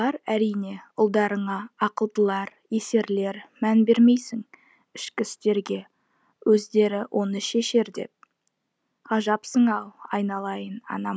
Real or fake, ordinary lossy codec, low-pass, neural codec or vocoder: real; none; none; none